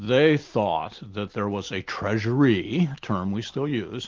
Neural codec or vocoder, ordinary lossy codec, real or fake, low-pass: none; Opus, 32 kbps; real; 7.2 kHz